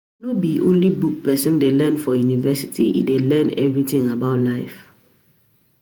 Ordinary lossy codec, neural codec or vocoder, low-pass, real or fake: none; vocoder, 48 kHz, 128 mel bands, Vocos; none; fake